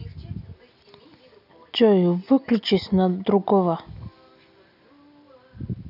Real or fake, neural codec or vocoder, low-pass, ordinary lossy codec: real; none; 5.4 kHz; none